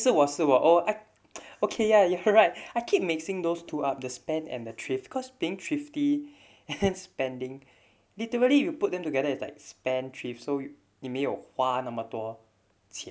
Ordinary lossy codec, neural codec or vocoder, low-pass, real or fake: none; none; none; real